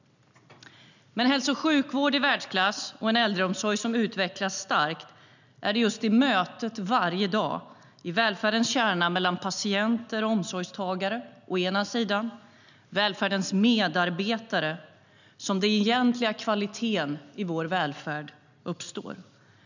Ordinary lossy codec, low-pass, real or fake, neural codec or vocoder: none; 7.2 kHz; real; none